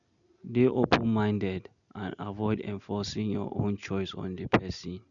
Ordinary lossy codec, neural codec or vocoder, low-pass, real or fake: none; none; 7.2 kHz; real